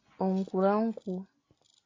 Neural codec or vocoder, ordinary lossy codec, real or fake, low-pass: none; AAC, 32 kbps; real; 7.2 kHz